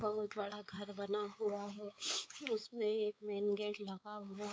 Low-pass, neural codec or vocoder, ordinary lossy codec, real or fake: none; codec, 16 kHz, 4 kbps, X-Codec, WavLM features, trained on Multilingual LibriSpeech; none; fake